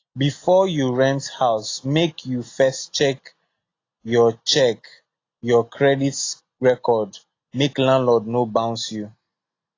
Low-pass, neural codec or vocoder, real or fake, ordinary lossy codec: 7.2 kHz; none; real; AAC, 32 kbps